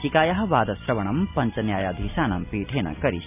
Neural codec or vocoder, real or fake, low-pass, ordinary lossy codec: none; real; 3.6 kHz; none